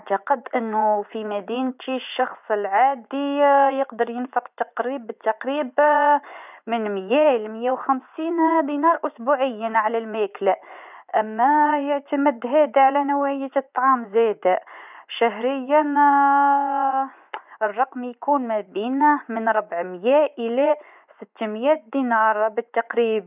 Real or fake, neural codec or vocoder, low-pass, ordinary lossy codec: fake; vocoder, 24 kHz, 100 mel bands, Vocos; 3.6 kHz; none